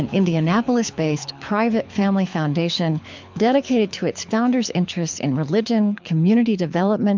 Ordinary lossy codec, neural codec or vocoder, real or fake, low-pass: MP3, 64 kbps; codec, 24 kHz, 6 kbps, HILCodec; fake; 7.2 kHz